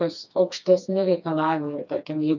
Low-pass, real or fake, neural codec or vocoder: 7.2 kHz; fake; codec, 16 kHz, 2 kbps, FreqCodec, smaller model